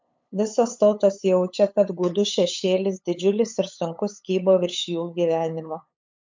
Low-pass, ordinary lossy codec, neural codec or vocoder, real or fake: 7.2 kHz; MP3, 64 kbps; codec, 16 kHz, 8 kbps, FunCodec, trained on LibriTTS, 25 frames a second; fake